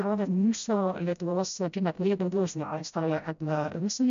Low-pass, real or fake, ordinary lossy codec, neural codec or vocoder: 7.2 kHz; fake; MP3, 64 kbps; codec, 16 kHz, 0.5 kbps, FreqCodec, smaller model